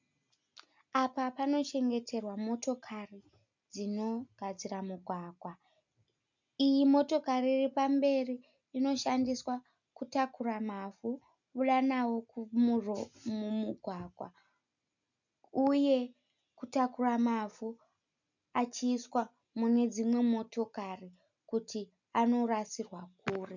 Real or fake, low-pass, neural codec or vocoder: real; 7.2 kHz; none